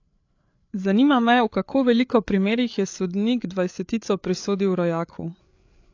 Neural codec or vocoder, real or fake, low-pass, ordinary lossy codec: codec, 16 kHz, 8 kbps, FreqCodec, larger model; fake; 7.2 kHz; AAC, 48 kbps